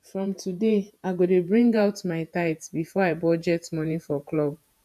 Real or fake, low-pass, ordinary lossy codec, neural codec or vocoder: fake; 14.4 kHz; none; vocoder, 48 kHz, 128 mel bands, Vocos